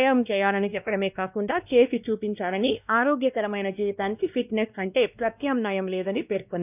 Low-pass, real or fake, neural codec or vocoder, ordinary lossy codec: 3.6 kHz; fake; codec, 16 kHz, 1 kbps, X-Codec, WavLM features, trained on Multilingual LibriSpeech; none